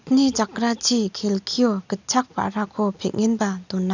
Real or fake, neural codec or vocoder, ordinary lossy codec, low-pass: real; none; none; 7.2 kHz